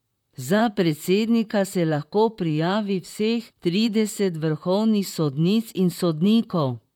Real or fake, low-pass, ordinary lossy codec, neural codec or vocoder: fake; 19.8 kHz; none; vocoder, 44.1 kHz, 128 mel bands, Pupu-Vocoder